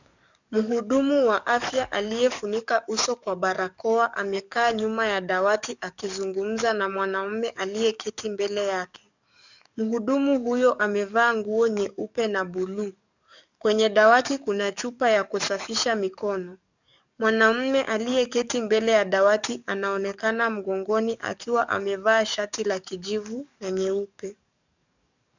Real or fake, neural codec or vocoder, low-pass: fake; codec, 44.1 kHz, 7.8 kbps, DAC; 7.2 kHz